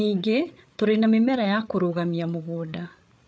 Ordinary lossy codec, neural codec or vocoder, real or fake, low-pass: none; codec, 16 kHz, 8 kbps, FreqCodec, larger model; fake; none